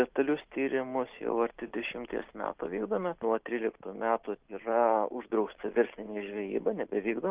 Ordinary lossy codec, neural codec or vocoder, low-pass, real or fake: Opus, 32 kbps; none; 3.6 kHz; real